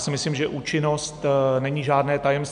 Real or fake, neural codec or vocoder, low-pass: real; none; 9.9 kHz